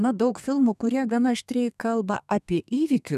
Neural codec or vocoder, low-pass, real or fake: codec, 32 kHz, 1.9 kbps, SNAC; 14.4 kHz; fake